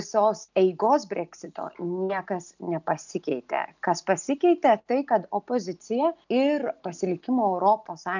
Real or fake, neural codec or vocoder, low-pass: real; none; 7.2 kHz